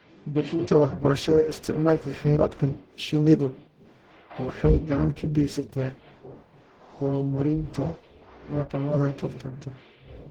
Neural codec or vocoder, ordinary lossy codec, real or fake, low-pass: codec, 44.1 kHz, 0.9 kbps, DAC; Opus, 16 kbps; fake; 19.8 kHz